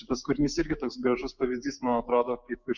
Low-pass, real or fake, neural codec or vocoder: 7.2 kHz; fake; codec, 16 kHz, 6 kbps, DAC